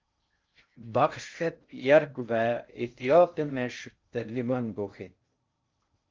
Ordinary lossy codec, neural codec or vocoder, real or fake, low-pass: Opus, 32 kbps; codec, 16 kHz in and 24 kHz out, 0.6 kbps, FocalCodec, streaming, 2048 codes; fake; 7.2 kHz